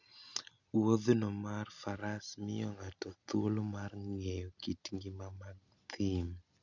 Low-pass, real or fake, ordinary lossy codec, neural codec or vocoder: 7.2 kHz; real; none; none